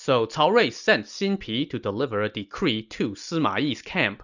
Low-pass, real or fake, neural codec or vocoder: 7.2 kHz; real; none